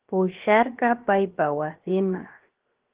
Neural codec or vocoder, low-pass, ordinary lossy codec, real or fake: codec, 16 kHz, 0.7 kbps, FocalCodec; 3.6 kHz; Opus, 16 kbps; fake